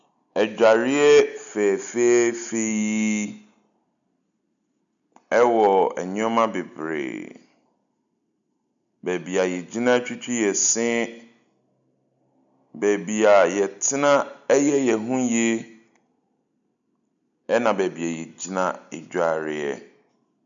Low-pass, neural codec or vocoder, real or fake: 7.2 kHz; none; real